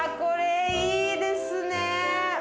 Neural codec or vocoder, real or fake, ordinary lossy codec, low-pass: none; real; none; none